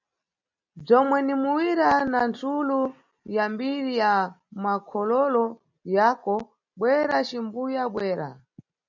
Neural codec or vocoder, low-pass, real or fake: none; 7.2 kHz; real